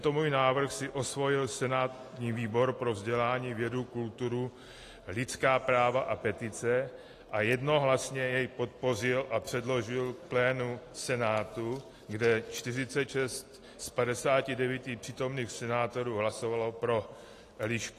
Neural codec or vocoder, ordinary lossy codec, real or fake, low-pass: none; AAC, 48 kbps; real; 14.4 kHz